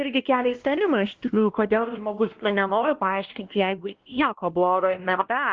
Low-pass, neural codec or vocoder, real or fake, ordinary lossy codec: 7.2 kHz; codec, 16 kHz, 1 kbps, X-Codec, WavLM features, trained on Multilingual LibriSpeech; fake; Opus, 16 kbps